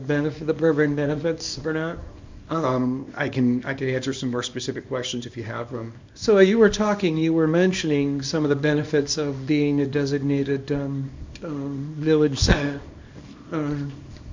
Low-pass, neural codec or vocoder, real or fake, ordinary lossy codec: 7.2 kHz; codec, 24 kHz, 0.9 kbps, WavTokenizer, small release; fake; MP3, 64 kbps